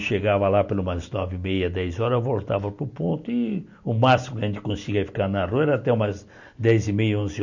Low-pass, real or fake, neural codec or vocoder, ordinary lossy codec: 7.2 kHz; real; none; none